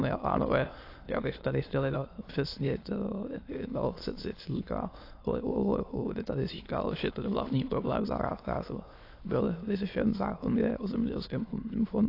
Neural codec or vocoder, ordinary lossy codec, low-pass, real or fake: autoencoder, 22.05 kHz, a latent of 192 numbers a frame, VITS, trained on many speakers; AAC, 32 kbps; 5.4 kHz; fake